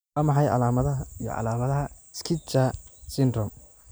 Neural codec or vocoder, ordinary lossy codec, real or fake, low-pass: none; none; real; none